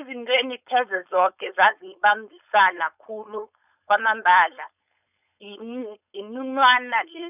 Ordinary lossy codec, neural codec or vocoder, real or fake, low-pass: none; codec, 16 kHz, 4.8 kbps, FACodec; fake; 3.6 kHz